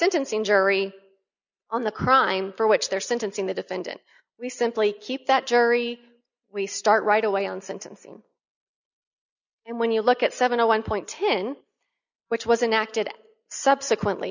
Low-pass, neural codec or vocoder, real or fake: 7.2 kHz; none; real